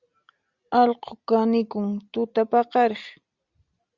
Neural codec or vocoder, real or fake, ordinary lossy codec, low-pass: none; real; Opus, 64 kbps; 7.2 kHz